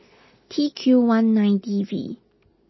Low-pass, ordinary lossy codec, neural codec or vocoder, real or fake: 7.2 kHz; MP3, 24 kbps; none; real